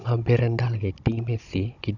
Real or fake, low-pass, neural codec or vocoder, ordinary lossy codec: fake; 7.2 kHz; codec, 16 kHz, 16 kbps, FunCodec, trained on Chinese and English, 50 frames a second; none